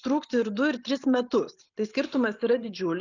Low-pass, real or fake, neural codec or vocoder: 7.2 kHz; real; none